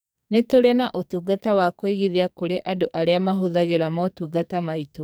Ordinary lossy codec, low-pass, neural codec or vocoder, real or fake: none; none; codec, 44.1 kHz, 2.6 kbps, SNAC; fake